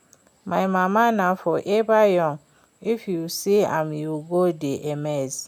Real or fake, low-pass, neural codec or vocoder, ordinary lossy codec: real; 19.8 kHz; none; none